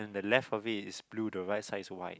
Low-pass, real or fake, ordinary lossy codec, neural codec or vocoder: none; real; none; none